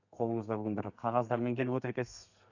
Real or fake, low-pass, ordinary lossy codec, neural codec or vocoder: fake; 7.2 kHz; none; codec, 32 kHz, 1.9 kbps, SNAC